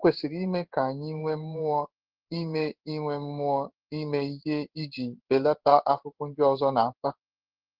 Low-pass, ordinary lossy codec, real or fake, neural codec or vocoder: 5.4 kHz; Opus, 16 kbps; fake; codec, 16 kHz in and 24 kHz out, 1 kbps, XY-Tokenizer